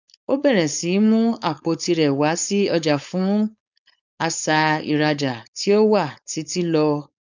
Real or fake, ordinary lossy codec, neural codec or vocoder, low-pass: fake; none; codec, 16 kHz, 4.8 kbps, FACodec; 7.2 kHz